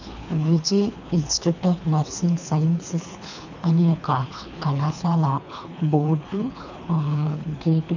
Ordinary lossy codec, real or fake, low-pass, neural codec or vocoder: none; fake; 7.2 kHz; codec, 24 kHz, 3 kbps, HILCodec